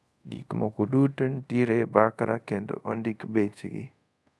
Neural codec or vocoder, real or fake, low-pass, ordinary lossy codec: codec, 24 kHz, 0.5 kbps, DualCodec; fake; none; none